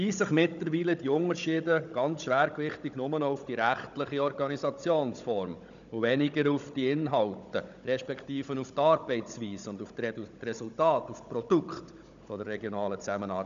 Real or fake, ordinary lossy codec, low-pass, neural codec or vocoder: fake; none; 7.2 kHz; codec, 16 kHz, 16 kbps, FunCodec, trained on Chinese and English, 50 frames a second